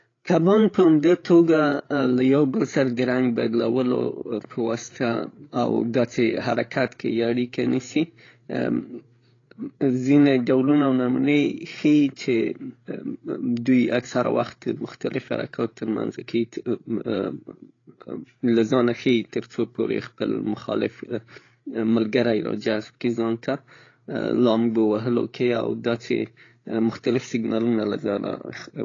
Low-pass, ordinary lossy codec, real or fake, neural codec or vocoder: 7.2 kHz; AAC, 32 kbps; fake; codec, 16 kHz, 8 kbps, FreqCodec, larger model